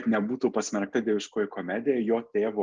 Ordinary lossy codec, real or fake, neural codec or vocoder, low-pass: Opus, 24 kbps; real; none; 7.2 kHz